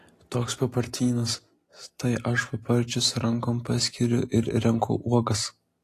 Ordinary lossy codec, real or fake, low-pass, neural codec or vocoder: AAC, 48 kbps; real; 14.4 kHz; none